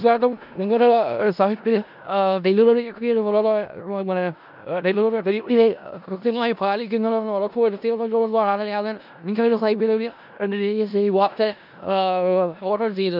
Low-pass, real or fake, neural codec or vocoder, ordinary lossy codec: 5.4 kHz; fake; codec, 16 kHz in and 24 kHz out, 0.4 kbps, LongCat-Audio-Codec, four codebook decoder; none